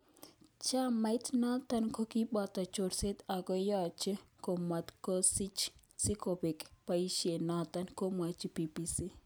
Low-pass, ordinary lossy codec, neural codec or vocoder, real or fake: none; none; none; real